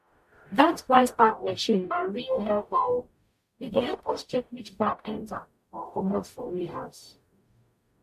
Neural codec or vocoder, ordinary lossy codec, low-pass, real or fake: codec, 44.1 kHz, 0.9 kbps, DAC; MP3, 64 kbps; 14.4 kHz; fake